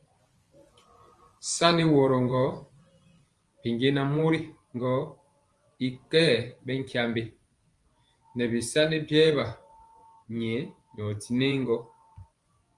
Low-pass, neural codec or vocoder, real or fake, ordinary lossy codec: 10.8 kHz; vocoder, 24 kHz, 100 mel bands, Vocos; fake; Opus, 32 kbps